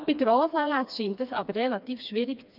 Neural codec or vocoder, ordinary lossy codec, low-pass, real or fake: codec, 16 kHz, 2 kbps, FreqCodec, smaller model; none; 5.4 kHz; fake